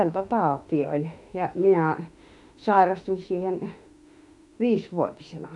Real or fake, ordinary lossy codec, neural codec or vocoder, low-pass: fake; none; autoencoder, 48 kHz, 32 numbers a frame, DAC-VAE, trained on Japanese speech; 10.8 kHz